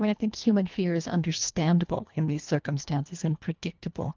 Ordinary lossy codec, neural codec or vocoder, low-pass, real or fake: Opus, 24 kbps; codec, 24 kHz, 1.5 kbps, HILCodec; 7.2 kHz; fake